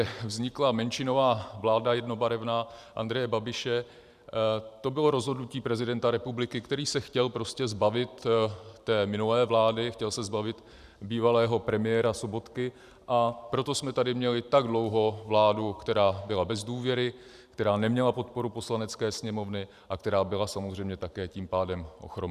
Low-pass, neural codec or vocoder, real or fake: 14.4 kHz; vocoder, 44.1 kHz, 128 mel bands every 512 samples, BigVGAN v2; fake